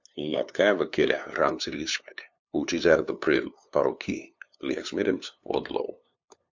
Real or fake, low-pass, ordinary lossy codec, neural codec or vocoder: fake; 7.2 kHz; MP3, 64 kbps; codec, 16 kHz, 2 kbps, FunCodec, trained on LibriTTS, 25 frames a second